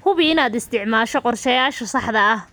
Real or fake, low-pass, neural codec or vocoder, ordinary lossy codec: real; none; none; none